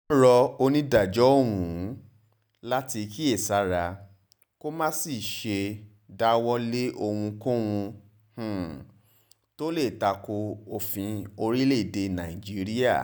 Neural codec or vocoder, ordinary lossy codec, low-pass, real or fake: none; none; none; real